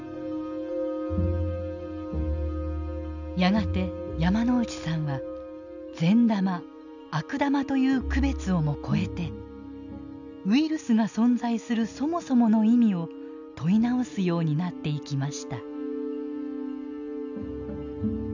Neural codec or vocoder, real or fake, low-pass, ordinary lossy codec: none; real; 7.2 kHz; none